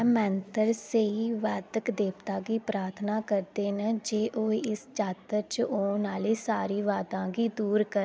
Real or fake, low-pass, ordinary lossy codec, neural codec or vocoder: real; none; none; none